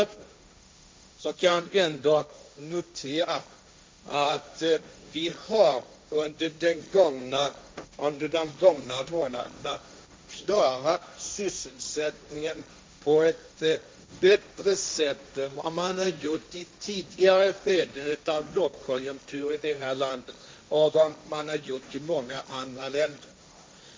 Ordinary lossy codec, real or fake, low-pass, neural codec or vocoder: none; fake; none; codec, 16 kHz, 1.1 kbps, Voila-Tokenizer